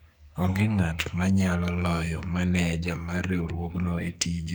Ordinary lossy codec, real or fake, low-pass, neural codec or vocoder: none; fake; none; codec, 44.1 kHz, 2.6 kbps, SNAC